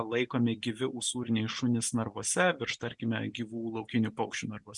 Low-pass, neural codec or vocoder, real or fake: 10.8 kHz; none; real